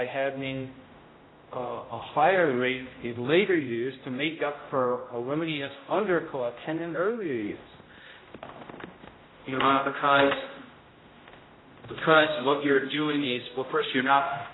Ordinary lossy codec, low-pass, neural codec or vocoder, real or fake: AAC, 16 kbps; 7.2 kHz; codec, 16 kHz, 0.5 kbps, X-Codec, HuBERT features, trained on general audio; fake